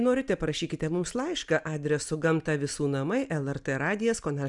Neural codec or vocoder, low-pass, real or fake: none; 10.8 kHz; real